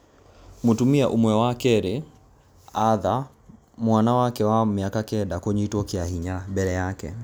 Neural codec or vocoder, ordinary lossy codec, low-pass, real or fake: none; none; none; real